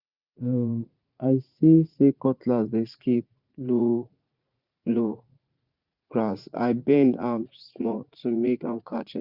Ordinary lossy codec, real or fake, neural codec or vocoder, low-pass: Opus, 64 kbps; fake; vocoder, 44.1 kHz, 80 mel bands, Vocos; 5.4 kHz